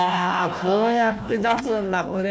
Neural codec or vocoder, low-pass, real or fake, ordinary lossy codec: codec, 16 kHz, 1 kbps, FunCodec, trained on Chinese and English, 50 frames a second; none; fake; none